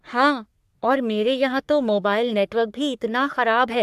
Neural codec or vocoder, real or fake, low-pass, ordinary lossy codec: codec, 44.1 kHz, 3.4 kbps, Pupu-Codec; fake; 14.4 kHz; AAC, 96 kbps